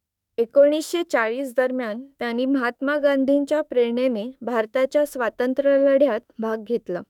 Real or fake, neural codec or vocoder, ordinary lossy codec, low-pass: fake; autoencoder, 48 kHz, 32 numbers a frame, DAC-VAE, trained on Japanese speech; none; 19.8 kHz